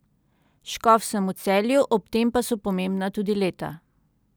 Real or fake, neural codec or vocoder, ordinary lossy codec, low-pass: real; none; none; none